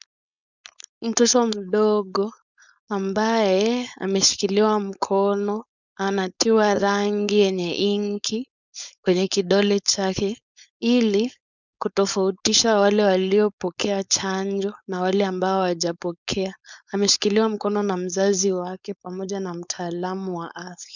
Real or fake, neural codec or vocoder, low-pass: fake; codec, 16 kHz, 4.8 kbps, FACodec; 7.2 kHz